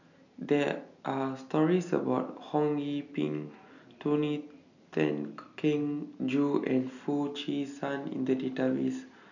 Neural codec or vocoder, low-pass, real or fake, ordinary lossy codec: none; 7.2 kHz; real; none